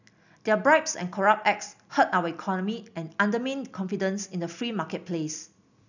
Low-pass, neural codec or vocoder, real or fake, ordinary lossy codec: 7.2 kHz; none; real; none